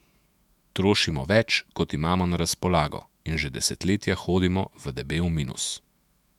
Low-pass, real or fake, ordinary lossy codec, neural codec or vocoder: 19.8 kHz; fake; MP3, 96 kbps; autoencoder, 48 kHz, 128 numbers a frame, DAC-VAE, trained on Japanese speech